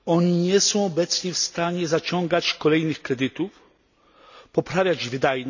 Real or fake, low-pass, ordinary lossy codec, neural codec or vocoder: real; 7.2 kHz; none; none